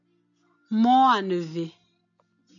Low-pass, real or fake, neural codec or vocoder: 7.2 kHz; real; none